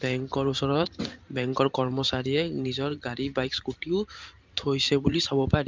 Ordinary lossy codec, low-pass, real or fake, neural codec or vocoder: Opus, 24 kbps; 7.2 kHz; fake; vocoder, 44.1 kHz, 128 mel bands every 512 samples, BigVGAN v2